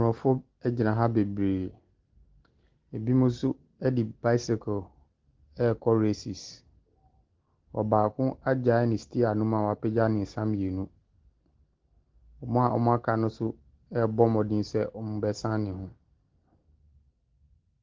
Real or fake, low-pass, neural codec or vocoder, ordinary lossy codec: real; 7.2 kHz; none; Opus, 16 kbps